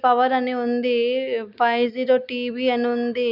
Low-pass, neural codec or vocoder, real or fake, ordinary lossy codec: 5.4 kHz; none; real; none